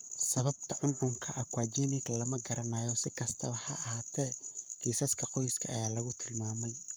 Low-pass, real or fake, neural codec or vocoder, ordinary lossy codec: none; fake; codec, 44.1 kHz, 7.8 kbps, Pupu-Codec; none